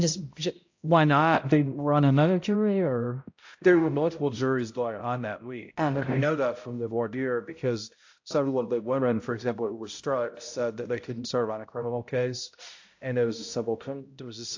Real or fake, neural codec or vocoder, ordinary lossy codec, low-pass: fake; codec, 16 kHz, 0.5 kbps, X-Codec, HuBERT features, trained on balanced general audio; AAC, 48 kbps; 7.2 kHz